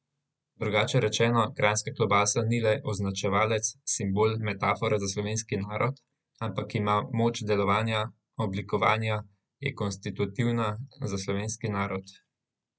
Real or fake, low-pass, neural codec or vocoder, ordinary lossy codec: real; none; none; none